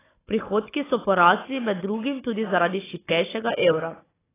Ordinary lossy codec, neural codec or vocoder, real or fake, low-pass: AAC, 16 kbps; codec, 16 kHz, 16 kbps, FunCodec, trained on Chinese and English, 50 frames a second; fake; 3.6 kHz